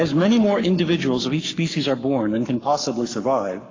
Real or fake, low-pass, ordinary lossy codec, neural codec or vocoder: fake; 7.2 kHz; AAC, 32 kbps; codec, 44.1 kHz, 7.8 kbps, Pupu-Codec